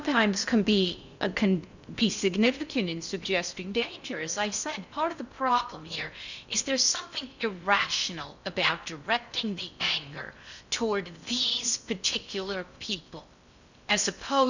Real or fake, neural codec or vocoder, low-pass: fake; codec, 16 kHz in and 24 kHz out, 0.6 kbps, FocalCodec, streaming, 2048 codes; 7.2 kHz